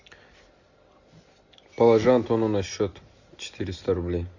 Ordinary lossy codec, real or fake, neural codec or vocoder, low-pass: Opus, 64 kbps; real; none; 7.2 kHz